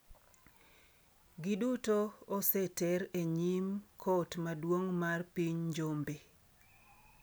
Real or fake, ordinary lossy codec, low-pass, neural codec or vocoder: real; none; none; none